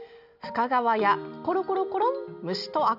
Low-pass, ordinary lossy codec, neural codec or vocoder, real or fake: 5.4 kHz; none; none; real